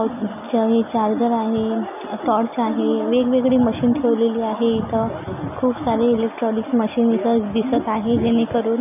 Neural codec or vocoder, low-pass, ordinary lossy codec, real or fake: none; 3.6 kHz; none; real